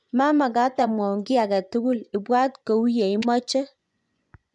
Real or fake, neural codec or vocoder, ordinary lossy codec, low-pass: fake; vocoder, 24 kHz, 100 mel bands, Vocos; none; 10.8 kHz